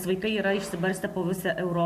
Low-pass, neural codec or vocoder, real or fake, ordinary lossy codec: 14.4 kHz; none; real; MP3, 64 kbps